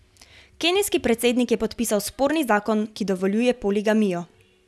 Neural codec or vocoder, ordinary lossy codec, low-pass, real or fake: none; none; none; real